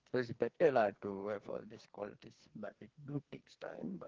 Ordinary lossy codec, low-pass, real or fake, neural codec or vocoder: Opus, 16 kbps; 7.2 kHz; fake; codec, 44.1 kHz, 2.6 kbps, SNAC